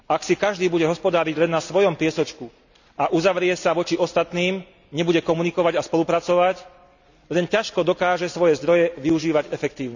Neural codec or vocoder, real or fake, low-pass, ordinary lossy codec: none; real; 7.2 kHz; none